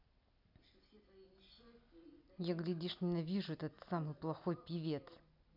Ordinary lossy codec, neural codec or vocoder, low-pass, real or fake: none; none; 5.4 kHz; real